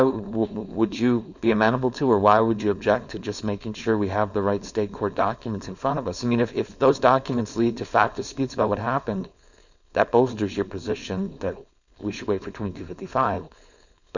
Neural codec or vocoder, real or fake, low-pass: codec, 16 kHz, 4.8 kbps, FACodec; fake; 7.2 kHz